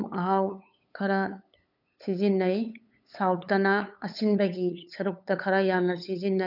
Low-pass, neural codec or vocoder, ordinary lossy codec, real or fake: 5.4 kHz; codec, 16 kHz, 8 kbps, FunCodec, trained on LibriTTS, 25 frames a second; none; fake